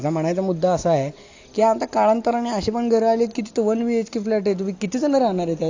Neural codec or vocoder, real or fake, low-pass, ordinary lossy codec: none; real; 7.2 kHz; AAC, 48 kbps